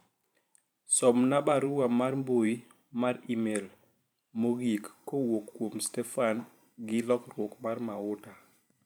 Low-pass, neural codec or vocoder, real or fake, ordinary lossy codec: none; vocoder, 44.1 kHz, 128 mel bands every 512 samples, BigVGAN v2; fake; none